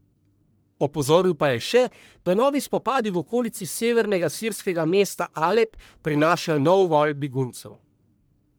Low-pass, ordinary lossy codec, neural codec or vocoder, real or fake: none; none; codec, 44.1 kHz, 1.7 kbps, Pupu-Codec; fake